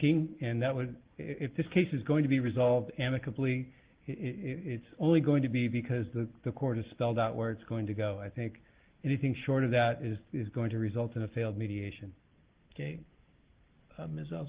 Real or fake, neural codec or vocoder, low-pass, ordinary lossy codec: real; none; 3.6 kHz; Opus, 16 kbps